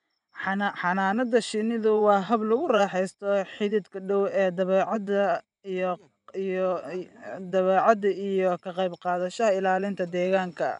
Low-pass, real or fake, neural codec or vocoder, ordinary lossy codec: 10.8 kHz; fake; vocoder, 24 kHz, 100 mel bands, Vocos; none